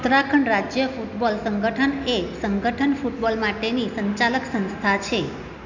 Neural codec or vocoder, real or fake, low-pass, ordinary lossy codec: none; real; 7.2 kHz; none